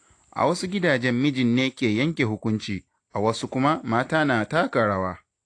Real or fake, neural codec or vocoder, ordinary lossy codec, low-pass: real; none; AAC, 48 kbps; 9.9 kHz